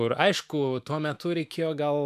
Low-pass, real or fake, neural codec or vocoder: 14.4 kHz; fake; autoencoder, 48 kHz, 128 numbers a frame, DAC-VAE, trained on Japanese speech